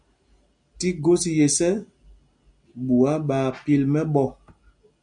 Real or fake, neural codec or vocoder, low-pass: real; none; 9.9 kHz